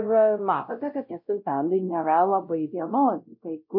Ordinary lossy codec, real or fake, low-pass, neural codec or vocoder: MP3, 32 kbps; fake; 5.4 kHz; codec, 16 kHz, 0.5 kbps, X-Codec, WavLM features, trained on Multilingual LibriSpeech